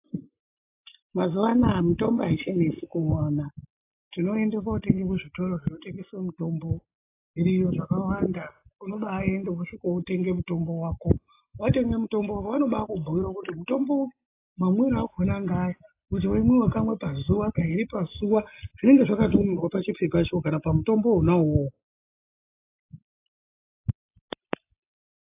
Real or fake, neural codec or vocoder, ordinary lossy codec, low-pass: real; none; AAC, 24 kbps; 3.6 kHz